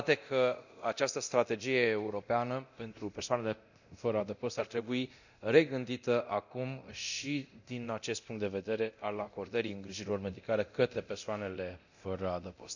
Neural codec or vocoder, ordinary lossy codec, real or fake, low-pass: codec, 24 kHz, 0.9 kbps, DualCodec; none; fake; 7.2 kHz